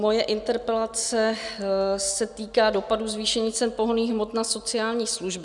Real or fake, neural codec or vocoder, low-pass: real; none; 10.8 kHz